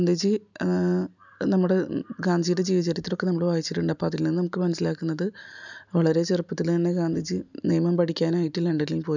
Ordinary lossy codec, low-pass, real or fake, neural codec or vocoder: none; 7.2 kHz; real; none